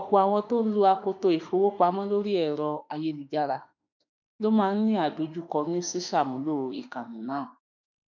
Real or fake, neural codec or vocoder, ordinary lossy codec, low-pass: fake; autoencoder, 48 kHz, 32 numbers a frame, DAC-VAE, trained on Japanese speech; none; 7.2 kHz